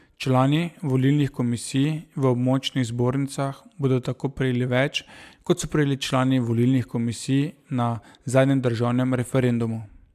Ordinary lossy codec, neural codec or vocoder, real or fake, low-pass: AAC, 96 kbps; none; real; 14.4 kHz